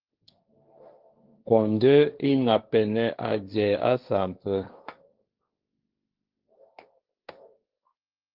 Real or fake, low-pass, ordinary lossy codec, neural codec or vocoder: fake; 5.4 kHz; Opus, 32 kbps; codec, 16 kHz, 1.1 kbps, Voila-Tokenizer